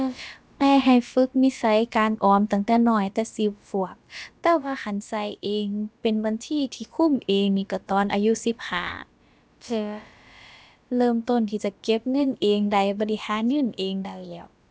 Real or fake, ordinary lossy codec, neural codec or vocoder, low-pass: fake; none; codec, 16 kHz, about 1 kbps, DyCAST, with the encoder's durations; none